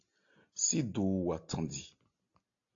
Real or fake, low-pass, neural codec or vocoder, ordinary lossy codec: real; 7.2 kHz; none; AAC, 48 kbps